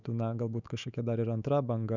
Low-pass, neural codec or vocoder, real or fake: 7.2 kHz; autoencoder, 48 kHz, 128 numbers a frame, DAC-VAE, trained on Japanese speech; fake